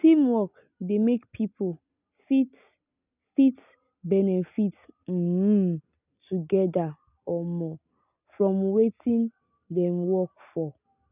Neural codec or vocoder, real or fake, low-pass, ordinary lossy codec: none; real; 3.6 kHz; none